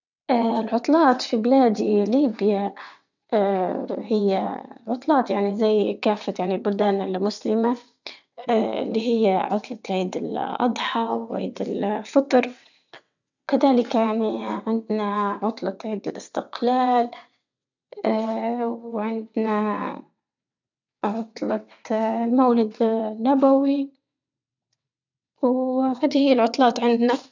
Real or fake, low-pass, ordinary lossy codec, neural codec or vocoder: fake; 7.2 kHz; none; vocoder, 22.05 kHz, 80 mel bands, WaveNeXt